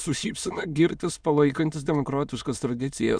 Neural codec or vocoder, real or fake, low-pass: autoencoder, 22.05 kHz, a latent of 192 numbers a frame, VITS, trained on many speakers; fake; 9.9 kHz